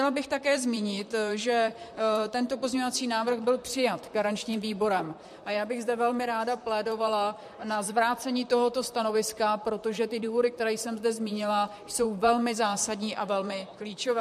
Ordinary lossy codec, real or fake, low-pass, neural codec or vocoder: MP3, 64 kbps; fake; 14.4 kHz; vocoder, 44.1 kHz, 128 mel bands, Pupu-Vocoder